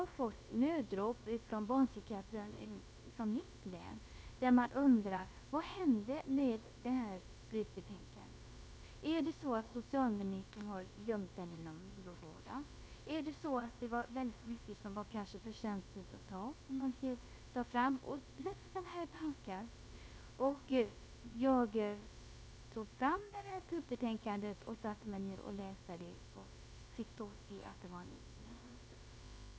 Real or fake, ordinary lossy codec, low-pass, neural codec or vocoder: fake; none; none; codec, 16 kHz, about 1 kbps, DyCAST, with the encoder's durations